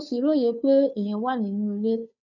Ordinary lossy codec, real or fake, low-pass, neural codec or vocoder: none; fake; 7.2 kHz; codec, 16 kHz, 2 kbps, FunCodec, trained on Chinese and English, 25 frames a second